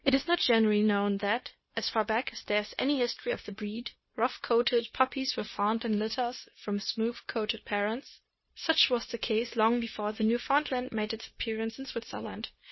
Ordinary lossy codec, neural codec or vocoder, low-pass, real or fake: MP3, 24 kbps; codec, 16 kHz, 0.9 kbps, LongCat-Audio-Codec; 7.2 kHz; fake